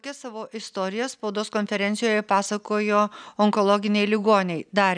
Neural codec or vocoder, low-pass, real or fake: none; 9.9 kHz; real